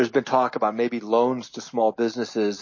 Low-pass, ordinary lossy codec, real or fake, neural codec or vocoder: 7.2 kHz; MP3, 32 kbps; real; none